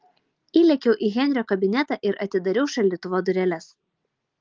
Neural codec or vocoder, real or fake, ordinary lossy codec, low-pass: none; real; Opus, 32 kbps; 7.2 kHz